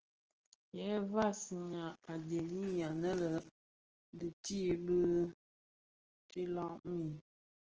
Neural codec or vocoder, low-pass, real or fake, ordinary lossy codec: none; 7.2 kHz; real; Opus, 16 kbps